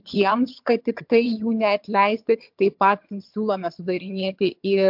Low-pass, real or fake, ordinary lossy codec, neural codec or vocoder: 5.4 kHz; fake; MP3, 48 kbps; vocoder, 22.05 kHz, 80 mel bands, HiFi-GAN